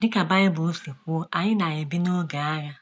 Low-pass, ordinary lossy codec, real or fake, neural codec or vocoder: none; none; real; none